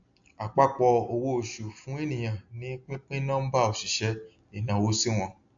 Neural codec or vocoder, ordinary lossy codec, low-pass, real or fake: none; AAC, 64 kbps; 7.2 kHz; real